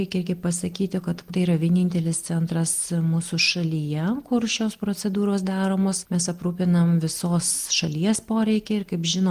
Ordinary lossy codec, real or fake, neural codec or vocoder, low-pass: Opus, 24 kbps; real; none; 14.4 kHz